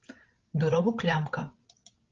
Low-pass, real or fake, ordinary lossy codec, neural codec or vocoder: 7.2 kHz; fake; Opus, 16 kbps; codec, 16 kHz, 16 kbps, FreqCodec, larger model